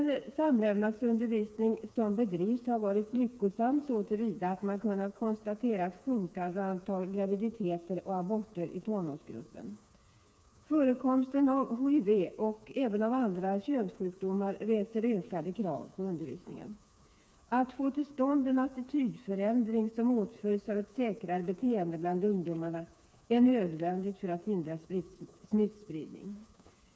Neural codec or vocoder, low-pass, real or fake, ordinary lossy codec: codec, 16 kHz, 4 kbps, FreqCodec, smaller model; none; fake; none